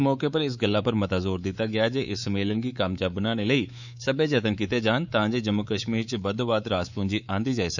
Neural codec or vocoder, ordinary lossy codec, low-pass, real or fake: codec, 24 kHz, 3.1 kbps, DualCodec; none; 7.2 kHz; fake